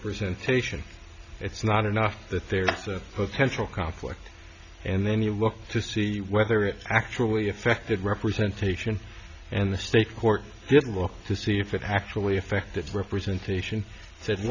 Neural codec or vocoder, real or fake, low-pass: none; real; 7.2 kHz